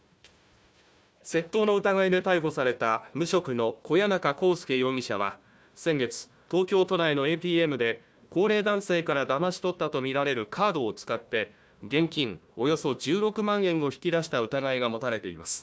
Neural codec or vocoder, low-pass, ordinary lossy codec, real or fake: codec, 16 kHz, 1 kbps, FunCodec, trained on Chinese and English, 50 frames a second; none; none; fake